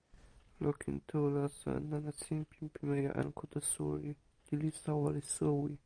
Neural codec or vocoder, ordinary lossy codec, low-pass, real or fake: none; AAC, 64 kbps; 9.9 kHz; real